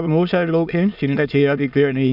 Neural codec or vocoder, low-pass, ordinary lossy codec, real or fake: autoencoder, 22.05 kHz, a latent of 192 numbers a frame, VITS, trained on many speakers; 5.4 kHz; none; fake